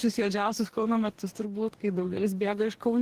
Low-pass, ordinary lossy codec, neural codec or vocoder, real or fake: 14.4 kHz; Opus, 16 kbps; codec, 44.1 kHz, 2.6 kbps, DAC; fake